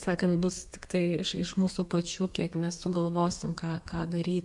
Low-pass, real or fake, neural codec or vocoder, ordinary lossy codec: 10.8 kHz; fake; codec, 32 kHz, 1.9 kbps, SNAC; MP3, 96 kbps